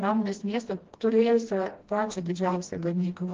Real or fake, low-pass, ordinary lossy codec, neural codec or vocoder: fake; 7.2 kHz; Opus, 16 kbps; codec, 16 kHz, 1 kbps, FreqCodec, smaller model